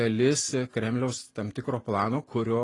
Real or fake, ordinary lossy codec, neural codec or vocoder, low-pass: real; AAC, 32 kbps; none; 10.8 kHz